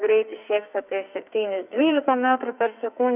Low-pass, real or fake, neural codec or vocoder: 3.6 kHz; fake; codec, 44.1 kHz, 2.6 kbps, SNAC